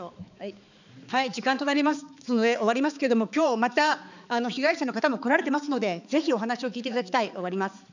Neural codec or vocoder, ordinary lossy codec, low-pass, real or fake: codec, 16 kHz, 4 kbps, X-Codec, HuBERT features, trained on balanced general audio; none; 7.2 kHz; fake